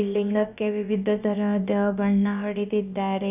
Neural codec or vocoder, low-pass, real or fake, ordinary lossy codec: codec, 16 kHz, about 1 kbps, DyCAST, with the encoder's durations; 3.6 kHz; fake; none